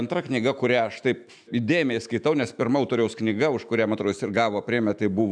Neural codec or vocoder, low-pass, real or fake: autoencoder, 48 kHz, 128 numbers a frame, DAC-VAE, trained on Japanese speech; 9.9 kHz; fake